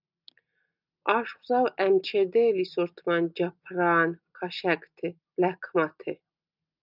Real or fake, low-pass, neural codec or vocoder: real; 5.4 kHz; none